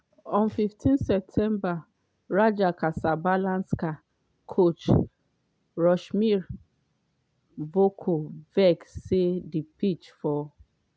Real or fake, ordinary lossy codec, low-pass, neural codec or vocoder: real; none; none; none